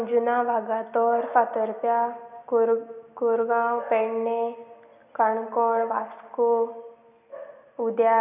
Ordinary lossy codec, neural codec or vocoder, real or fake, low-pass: none; none; real; 3.6 kHz